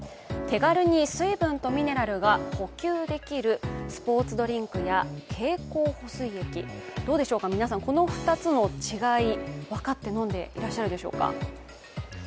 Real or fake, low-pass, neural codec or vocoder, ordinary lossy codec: real; none; none; none